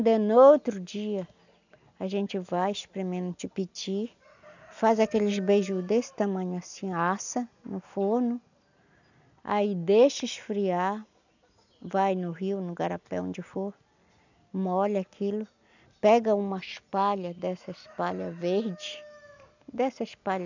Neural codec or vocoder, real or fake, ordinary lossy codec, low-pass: none; real; none; 7.2 kHz